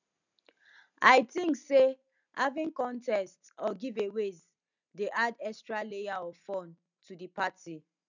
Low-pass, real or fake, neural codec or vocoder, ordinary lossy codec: 7.2 kHz; real; none; none